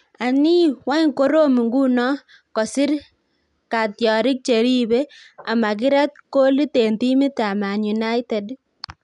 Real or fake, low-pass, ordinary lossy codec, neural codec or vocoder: real; 10.8 kHz; none; none